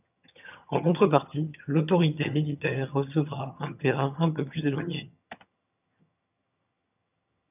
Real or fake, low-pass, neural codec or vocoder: fake; 3.6 kHz; vocoder, 22.05 kHz, 80 mel bands, HiFi-GAN